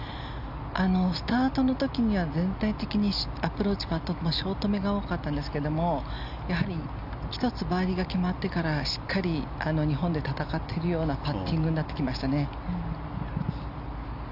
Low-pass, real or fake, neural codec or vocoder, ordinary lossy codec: 5.4 kHz; real; none; none